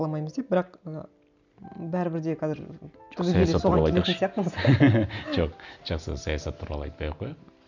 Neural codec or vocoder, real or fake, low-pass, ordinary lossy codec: none; real; 7.2 kHz; none